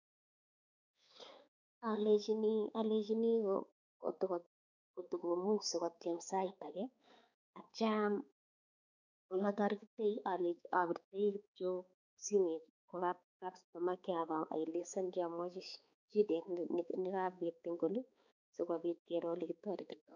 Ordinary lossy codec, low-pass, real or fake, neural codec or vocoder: none; 7.2 kHz; fake; codec, 16 kHz, 4 kbps, X-Codec, HuBERT features, trained on balanced general audio